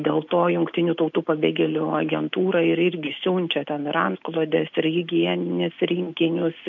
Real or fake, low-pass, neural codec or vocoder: real; 7.2 kHz; none